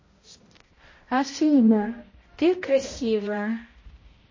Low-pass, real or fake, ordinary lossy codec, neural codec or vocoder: 7.2 kHz; fake; MP3, 32 kbps; codec, 16 kHz, 0.5 kbps, X-Codec, HuBERT features, trained on balanced general audio